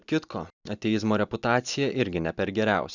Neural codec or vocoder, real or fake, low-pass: none; real; 7.2 kHz